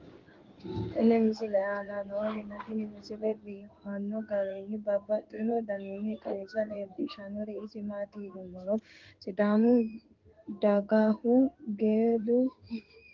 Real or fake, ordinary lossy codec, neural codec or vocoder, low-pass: fake; Opus, 32 kbps; codec, 16 kHz in and 24 kHz out, 1 kbps, XY-Tokenizer; 7.2 kHz